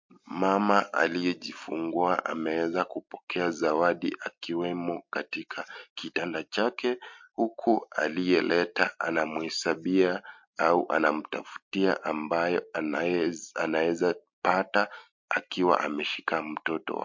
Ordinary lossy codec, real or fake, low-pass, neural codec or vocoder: MP3, 48 kbps; real; 7.2 kHz; none